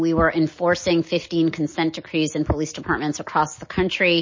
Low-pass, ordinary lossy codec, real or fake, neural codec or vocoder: 7.2 kHz; MP3, 32 kbps; real; none